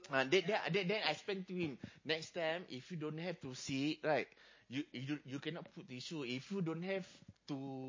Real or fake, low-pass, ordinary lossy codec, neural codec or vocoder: real; 7.2 kHz; MP3, 32 kbps; none